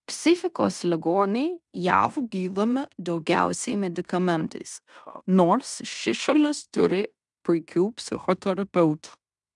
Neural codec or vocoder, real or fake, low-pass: codec, 16 kHz in and 24 kHz out, 0.9 kbps, LongCat-Audio-Codec, fine tuned four codebook decoder; fake; 10.8 kHz